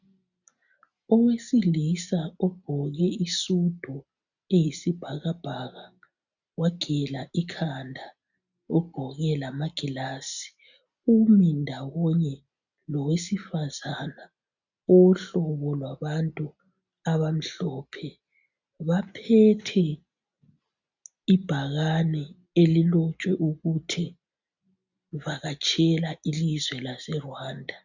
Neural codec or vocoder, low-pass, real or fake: none; 7.2 kHz; real